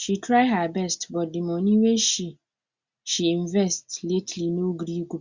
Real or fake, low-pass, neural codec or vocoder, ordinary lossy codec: real; 7.2 kHz; none; Opus, 64 kbps